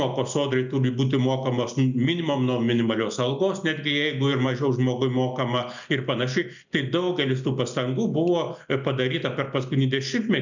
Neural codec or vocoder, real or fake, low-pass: none; real; 7.2 kHz